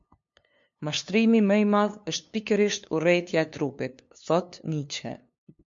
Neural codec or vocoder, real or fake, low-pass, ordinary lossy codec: codec, 16 kHz, 2 kbps, FunCodec, trained on LibriTTS, 25 frames a second; fake; 7.2 kHz; MP3, 48 kbps